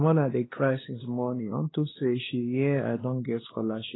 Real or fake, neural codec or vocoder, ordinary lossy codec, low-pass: fake; codec, 16 kHz, 2 kbps, X-Codec, WavLM features, trained on Multilingual LibriSpeech; AAC, 16 kbps; 7.2 kHz